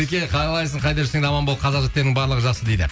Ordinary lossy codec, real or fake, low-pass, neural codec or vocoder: none; real; none; none